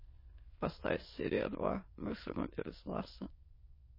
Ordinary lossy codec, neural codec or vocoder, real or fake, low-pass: MP3, 24 kbps; autoencoder, 22.05 kHz, a latent of 192 numbers a frame, VITS, trained on many speakers; fake; 5.4 kHz